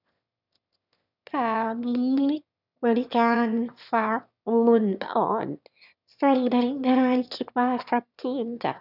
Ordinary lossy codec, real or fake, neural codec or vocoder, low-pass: none; fake; autoencoder, 22.05 kHz, a latent of 192 numbers a frame, VITS, trained on one speaker; 5.4 kHz